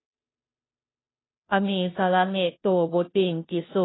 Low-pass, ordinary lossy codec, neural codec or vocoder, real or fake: 7.2 kHz; AAC, 16 kbps; codec, 16 kHz, 0.5 kbps, FunCodec, trained on Chinese and English, 25 frames a second; fake